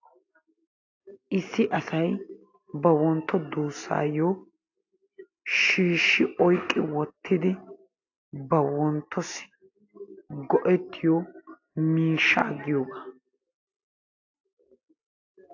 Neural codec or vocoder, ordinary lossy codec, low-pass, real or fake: none; AAC, 48 kbps; 7.2 kHz; real